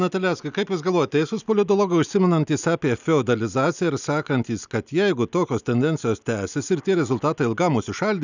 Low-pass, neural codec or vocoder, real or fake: 7.2 kHz; none; real